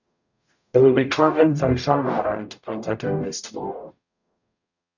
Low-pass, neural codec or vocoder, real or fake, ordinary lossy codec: 7.2 kHz; codec, 44.1 kHz, 0.9 kbps, DAC; fake; none